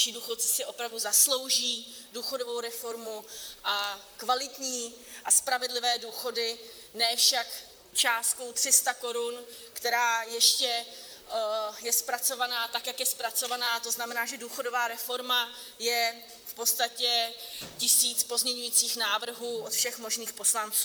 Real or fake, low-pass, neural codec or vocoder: fake; 19.8 kHz; vocoder, 44.1 kHz, 128 mel bands, Pupu-Vocoder